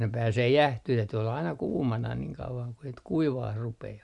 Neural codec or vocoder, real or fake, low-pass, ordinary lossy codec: none; real; 10.8 kHz; none